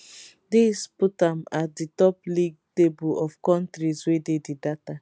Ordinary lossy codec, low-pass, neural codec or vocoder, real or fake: none; none; none; real